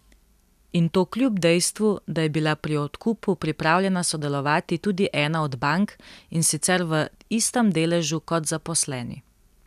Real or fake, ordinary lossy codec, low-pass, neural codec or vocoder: real; none; 14.4 kHz; none